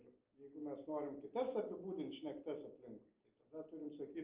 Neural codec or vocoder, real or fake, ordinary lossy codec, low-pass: none; real; Opus, 32 kbps; 3.6 kHz